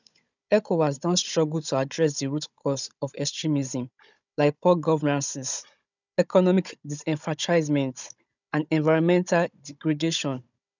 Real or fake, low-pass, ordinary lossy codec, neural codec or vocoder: fake; 7.2 kHz; none; codec, 16 kHz, 16 kbps, FunCodec, trained on Chinese and English, 50 frames a second